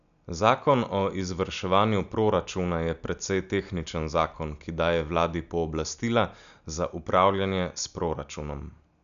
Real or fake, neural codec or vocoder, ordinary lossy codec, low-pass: real; none; none; 7.2 kHz